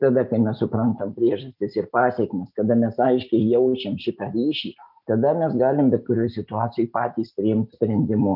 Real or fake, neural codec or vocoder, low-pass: real; none; 5.4 kHz